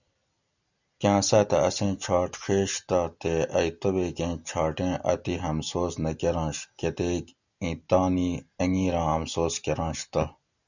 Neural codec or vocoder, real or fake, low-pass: none; real; 7.2 kHz